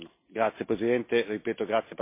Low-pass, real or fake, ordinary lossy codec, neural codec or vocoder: 3.6 kHz; real; MP3, 24 kbps; none